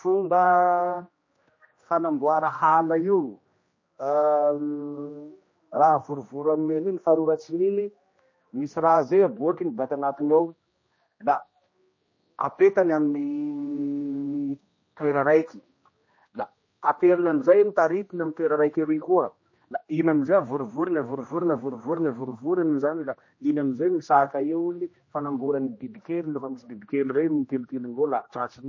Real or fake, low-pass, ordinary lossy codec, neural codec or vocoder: fake; 7.2 kHz; MP3, 32 kbps; codec, 16 kHz, 1 kbps, X-Codec, HuBERT features, trained on general audio